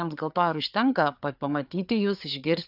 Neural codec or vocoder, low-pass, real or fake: codec, 16 kHz, 4 kbps, FreqCodec, larger model; 5.4 kHz; fake